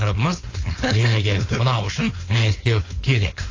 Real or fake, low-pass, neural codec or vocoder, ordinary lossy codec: fake; 7.2 kHz; codec, 16 kHz, 4.8 kbps, FACodec; AAC, 32 kbps